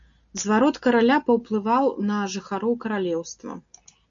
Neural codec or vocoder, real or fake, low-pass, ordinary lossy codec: none; real; 7.2 kHz; MP3, 64 kbps